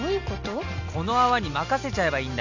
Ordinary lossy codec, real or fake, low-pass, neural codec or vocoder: none; real; 7.2 kHz; none